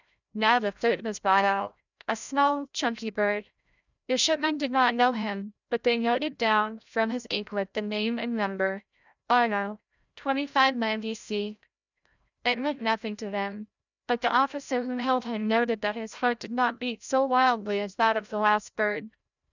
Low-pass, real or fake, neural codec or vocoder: 7.2 kHz; fake; codec, 16 kHz, 0.5 kbps, FreqCodec, larger model